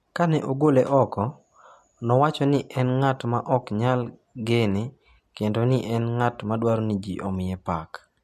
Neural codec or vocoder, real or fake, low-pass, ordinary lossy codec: none; real; 14.4 kHz; MP3, 64 kbps